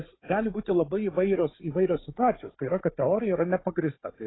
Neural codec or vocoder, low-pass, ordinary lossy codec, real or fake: codec, 16 kHz, 4 kbps, FunCodec, trained on Chinese and English, 50 frames a second; 7.2 kHz; AAC, 16 kbps; fake